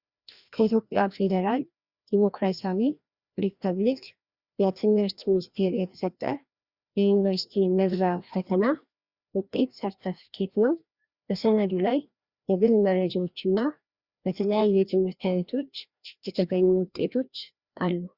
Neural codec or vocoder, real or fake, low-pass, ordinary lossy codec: codec, 16 kHz, 1 kbps, FreqCodec, larger model; fake; 5.4 kHz; Opus, 64 kbps